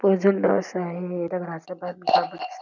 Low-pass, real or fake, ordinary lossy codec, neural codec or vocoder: 7.2 kHz; real; none; none